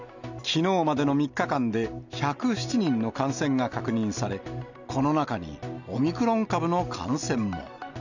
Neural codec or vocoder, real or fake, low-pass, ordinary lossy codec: none; real; 7.2 kHz; none